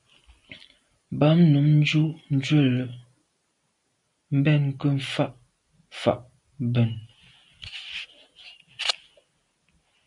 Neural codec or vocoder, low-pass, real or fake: none; 10.8 kHz; real